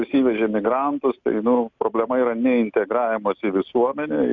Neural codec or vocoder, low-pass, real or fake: none; 7.2 kHz; real